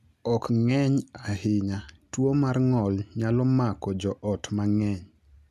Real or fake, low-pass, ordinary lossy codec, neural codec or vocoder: real; 14.4 kHz; none; none